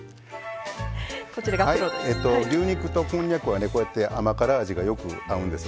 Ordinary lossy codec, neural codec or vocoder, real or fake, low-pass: none; none; real; none